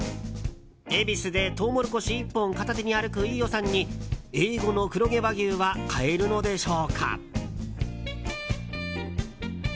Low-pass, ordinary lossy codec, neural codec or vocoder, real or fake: none; none; none; real